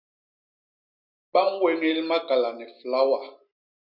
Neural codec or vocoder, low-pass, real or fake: none; 5.4 kHz; real